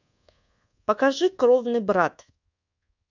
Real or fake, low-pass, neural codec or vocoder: fake; 7.2 kHz; codec, 24 kHz, 1.2 kbps, DualCodec